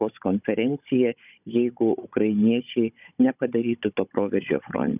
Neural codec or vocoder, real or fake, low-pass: codec, 16 kHz, 16 kbps, FunCodec, trained on Chinese and English, 50 frames a second; fake; 3.6 kHz